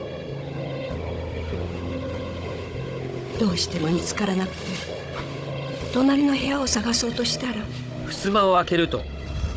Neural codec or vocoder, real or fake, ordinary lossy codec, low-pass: codec, 16 kHz, 16 kbps, FunCodec, trained on Chinese and English, 50 frames a second; fake; none; none